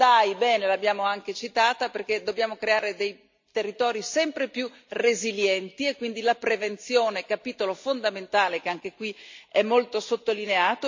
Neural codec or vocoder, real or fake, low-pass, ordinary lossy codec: none; real; 7.2 kHz; MP3, 48 kbps